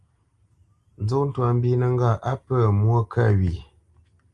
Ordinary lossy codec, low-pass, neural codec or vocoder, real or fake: Opus, 32 kbps; 10.8 kHz; none; real